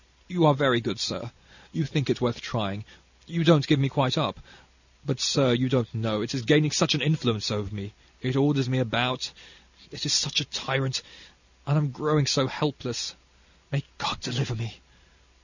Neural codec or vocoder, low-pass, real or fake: none; 7.2 kHz; real